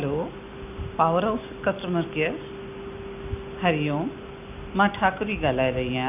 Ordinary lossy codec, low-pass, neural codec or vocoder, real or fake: none; 3.6 kHz; none; real